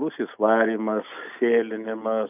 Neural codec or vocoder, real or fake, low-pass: none; real; 3.6 kHz